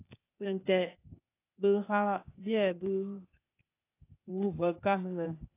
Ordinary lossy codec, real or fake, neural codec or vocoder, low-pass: AAC, 24 kbps; fake; codec, 16 kHz, 0.8 kbps, ZipCodec; 3.6 kHz